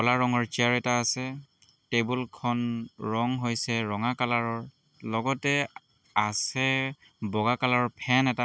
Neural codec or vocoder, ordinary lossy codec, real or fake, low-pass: none; none; real; none